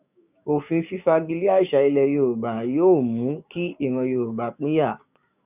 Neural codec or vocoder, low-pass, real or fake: codec, 16 kHz, 6 kbps, DAC; 3.6 kHz; fake